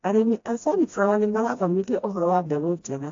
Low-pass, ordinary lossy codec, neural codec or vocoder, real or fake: 7.2 kHz; AAC, 48 kbps; codec, 16 kHz, 1 kbps, FreqCodec, smaller model; fake